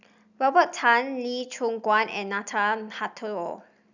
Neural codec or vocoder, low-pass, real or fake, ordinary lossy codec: none; 7.2 kHz; real; none